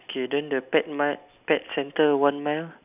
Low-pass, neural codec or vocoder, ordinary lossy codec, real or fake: 3.6 kHz; none; none; real